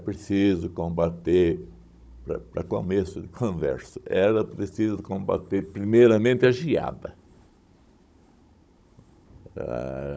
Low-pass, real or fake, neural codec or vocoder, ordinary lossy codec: none; fake; codec, 16 kHz, 16 kbps, FunCodec, trained on Chinese and English, 50 frames a second; none